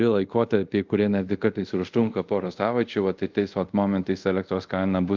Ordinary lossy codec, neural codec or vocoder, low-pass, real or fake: Opus, 24 kbps; codec, 24 kHz, 0.5 kbps, DualCodec; 7.2 kHz; fake